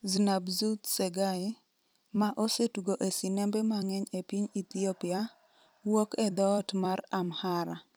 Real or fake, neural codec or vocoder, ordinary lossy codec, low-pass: fake; vocoder, 44.1 kHz, 128 mel bands every 256 samples, BigVGAN v2; none; none